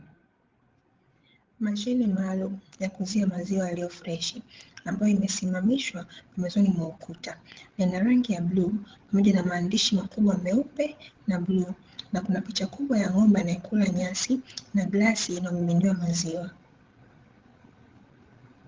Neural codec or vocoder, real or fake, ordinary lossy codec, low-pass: codec, 16 kHz, 16 kbps, FreqCodec, larger model; fake; Opus, 16 kbps; 7.2 kHz